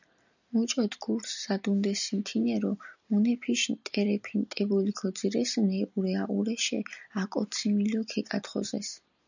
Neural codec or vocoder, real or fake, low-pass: none; real; 7.2 kHz